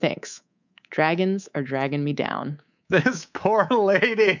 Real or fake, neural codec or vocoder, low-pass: fake; codec, 24 kHz, 3.1 kbps, DualCodec; 7.2 kHz